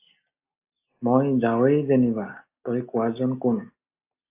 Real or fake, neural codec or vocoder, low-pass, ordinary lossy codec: real; none; 3.6 kHz; AAC, 24 kbps